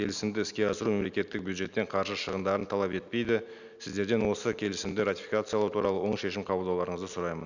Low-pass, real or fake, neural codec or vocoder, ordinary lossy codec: 7.2 kHz; real; none; none